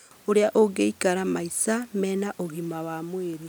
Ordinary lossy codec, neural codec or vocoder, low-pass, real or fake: none; none; none; real